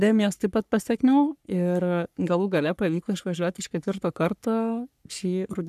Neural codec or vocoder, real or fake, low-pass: codec, 44.1 kHz, 3.4 kbps, Pupu-Codec; fake; 14.4 kHz